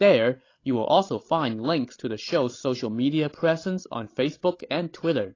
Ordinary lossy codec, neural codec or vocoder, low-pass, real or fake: AAC, 32 kbps; codec, 16 kHz, 16 kbps, FreqCodec, larger model; 7.2 kHz; fake